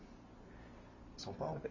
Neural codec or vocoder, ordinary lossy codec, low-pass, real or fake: none; AAC, 32 kbps; 7.2 kHz; real